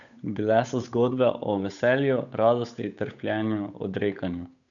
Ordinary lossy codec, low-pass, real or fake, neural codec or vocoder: none; 7.2 kHz; fake; codec, 16 kHz, 8 kbps, FunCodec, trained on Chinese and English, 25 frames a second